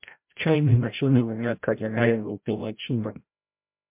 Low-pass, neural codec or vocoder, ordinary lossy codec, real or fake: 3.6 kHz; codec, 16 kHz, 0.5 kbps, FreqCodec, larger model; MP3, 32 kbps; fake